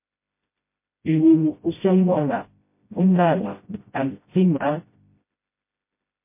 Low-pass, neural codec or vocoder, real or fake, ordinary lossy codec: 3.6 kHz; codec, 16 kHz, 0.5 kbps, FreqCodec, smaller model; fake; MP3, 24 kbps